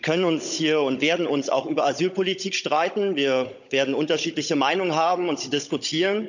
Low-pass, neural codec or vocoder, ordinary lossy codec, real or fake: 7.2 kHz; codec, 16 kHz, 16 kbps, FunCodec, trained on Chinese and English, 50 frames a second; none; fake